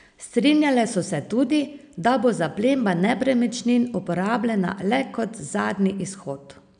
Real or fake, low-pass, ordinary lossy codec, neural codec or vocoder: real; 9.9 kHz; none; none